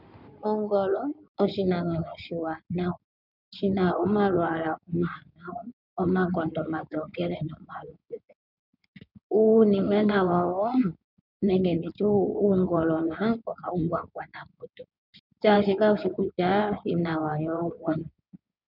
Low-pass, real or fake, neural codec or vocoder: 5.4 kHz; fake; codec, 16 kHz in and 24 kHz out, 2.2 kbps, FireRedTTS-2 codec